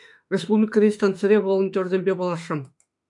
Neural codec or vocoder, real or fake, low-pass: autoencoder, 48 kHz, 32 numbers a frame, DAC-VAE, trained on Japanese speech; fake; 10.8 kHz